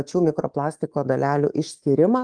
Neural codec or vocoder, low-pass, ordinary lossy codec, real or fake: codec, 24 kHz, 3.1 kbps, DualCodec; 9.9 kHz; Opus, 24 kbps; fake